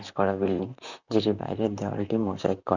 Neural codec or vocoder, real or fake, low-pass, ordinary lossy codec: vocoder, 44.1 kHz, 128 mel bands, Pupu-Vocoder; fake; 7.2 kHz; none